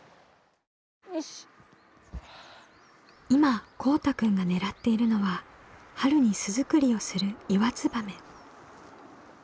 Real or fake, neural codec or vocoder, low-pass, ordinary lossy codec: real; none; none; none